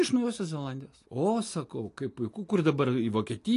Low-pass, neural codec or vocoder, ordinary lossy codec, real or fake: 10.8 kHz; none; AAC, 48 kbps; real